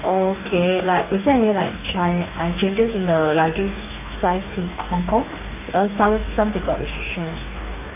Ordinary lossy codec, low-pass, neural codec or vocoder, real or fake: none; 3.6 kHz; codec, 44.1 kHz, 2.6 kbps, DAC; fake